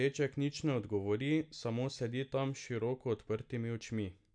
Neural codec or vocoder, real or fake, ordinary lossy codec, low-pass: none; real; none; 9.9 kHz